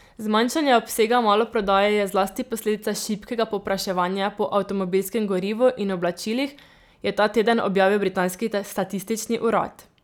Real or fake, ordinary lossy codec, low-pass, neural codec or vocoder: real; none; 19.8 kHz; none